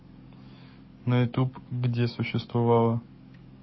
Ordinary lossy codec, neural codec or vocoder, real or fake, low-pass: MP3, 24 kbps; codec, 44.1 kHz, 7.8 kbps, DAC; fake; 7.2 kHz